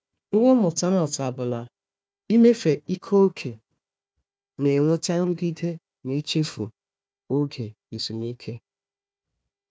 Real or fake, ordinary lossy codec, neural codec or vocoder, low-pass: fake; none; codec, 16 kHz, 1 kbps, FunCodec, trained on Chinese and English, 50 frames a second; none